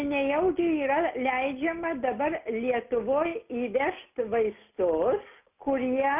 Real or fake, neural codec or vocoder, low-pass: real; none; 3.6 kHz